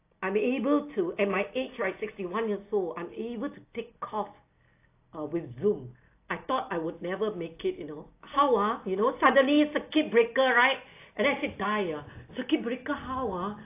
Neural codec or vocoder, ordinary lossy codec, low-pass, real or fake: none; AAC, 24 kbps; 3.6 kHz; real